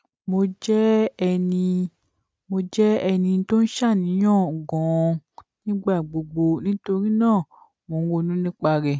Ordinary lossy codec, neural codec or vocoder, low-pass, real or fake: none; none; none; real